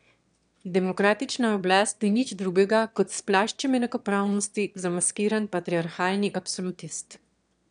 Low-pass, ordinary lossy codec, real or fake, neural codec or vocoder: 9.9 kHz; none; fake; autoencoder, 22.05 kHz, a latent of 192 numbers a frame, VITS, trained on one speaker